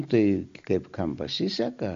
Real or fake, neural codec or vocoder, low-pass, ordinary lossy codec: real; none; 7.2 kHz; MP3, 48 kbps